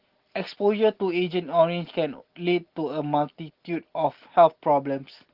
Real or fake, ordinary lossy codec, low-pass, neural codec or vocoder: real; Opus, 16 kbps; 5.4 kHz; none